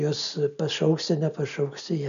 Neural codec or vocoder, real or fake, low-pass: none; real; 7.2 kHz